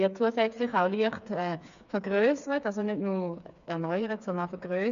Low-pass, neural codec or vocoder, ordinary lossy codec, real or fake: 7.2 kHz; codec, 16 kHz, 4 kbps, FreqCodec, smaller model; AAC, 96 kbps; fake